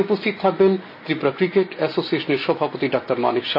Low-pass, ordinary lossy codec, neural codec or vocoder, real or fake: 5.4 kHz; MP3, 24 kbps; none; real